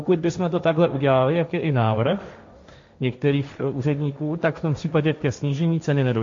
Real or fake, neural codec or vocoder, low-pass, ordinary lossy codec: fake; codec, 16 kHz, 1.1 kbps, Voila-Tokenizer; 7.2 kHz; MP3, 48 kbps